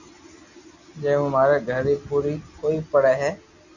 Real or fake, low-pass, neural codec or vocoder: real; 7.2 kHz; none